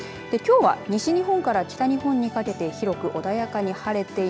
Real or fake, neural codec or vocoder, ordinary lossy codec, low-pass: real; none; none; none